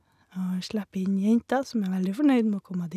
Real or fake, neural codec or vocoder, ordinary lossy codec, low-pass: real; none; none; 14.4 kHz